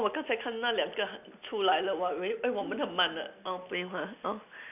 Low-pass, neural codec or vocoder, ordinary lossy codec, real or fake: 3.6 kHz; none; none; real